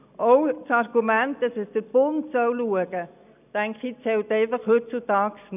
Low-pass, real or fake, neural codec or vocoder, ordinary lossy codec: 3.6 kHz; real; none; none